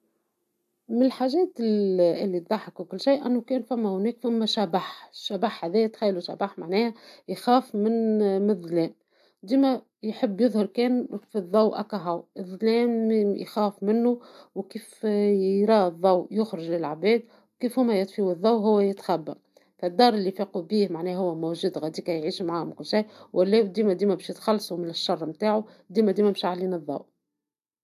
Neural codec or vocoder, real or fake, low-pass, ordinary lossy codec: none; real; 14.4 kHz; AAC, 96 kbps